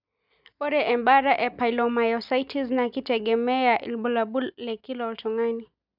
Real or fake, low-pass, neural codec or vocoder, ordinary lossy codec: real; 5.4 kHz; none; none